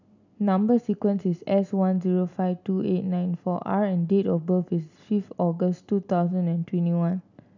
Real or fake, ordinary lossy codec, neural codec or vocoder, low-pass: real; none; none; 7.2 kHz